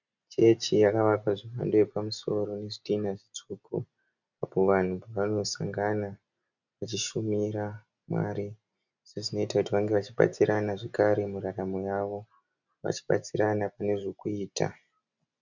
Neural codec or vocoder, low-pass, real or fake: none; 7.2 kHz; real